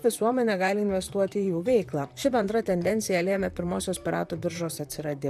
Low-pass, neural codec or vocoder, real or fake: 14.4 kHz; vocoder, 44.1 kHz, 128 mel bands, Pupu-Vocoder; fake